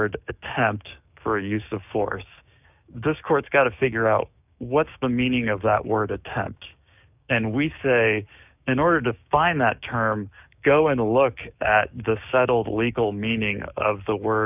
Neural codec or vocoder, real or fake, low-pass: codec, 16 kHz, 6 kbps, DAC; fake; 3.6 kHz